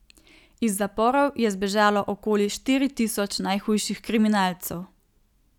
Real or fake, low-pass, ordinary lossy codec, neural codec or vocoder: real; 19.8 kHz; none; none